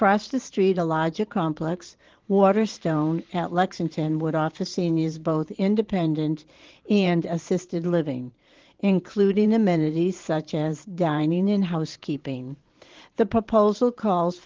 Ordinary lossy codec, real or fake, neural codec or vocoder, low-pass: Opus, 16 kbps; fake; vocoder, 44.1 kHz, 128 mel bands every 512 samples, BigVGAN v2; 7.2 kHz